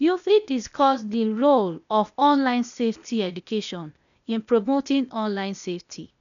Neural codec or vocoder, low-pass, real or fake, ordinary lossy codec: codec, 16 kHz, 0.8 kbps, ZipCodec; 7.2 kHz; fake; none